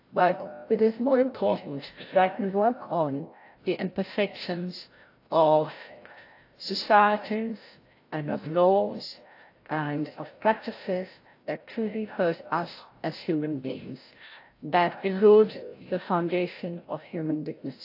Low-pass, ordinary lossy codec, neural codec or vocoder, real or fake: 5.4 kHz; AAC, 32 kbps; codec, 16 kHz, 0.5 kbps, FreqCodec, larger model; fake